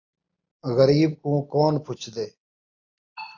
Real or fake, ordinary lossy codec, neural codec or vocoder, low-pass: real; AAC, 48 kbps; none; 7.2 kHz